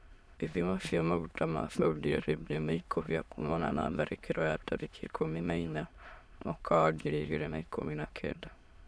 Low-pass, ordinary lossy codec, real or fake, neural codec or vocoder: none; none; fake; autoencoder, 22.05 kHz, a latent of 192 numbers a frame, VITS, trained on many speakers